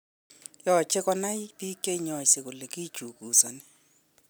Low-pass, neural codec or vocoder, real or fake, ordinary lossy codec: none; none; real; none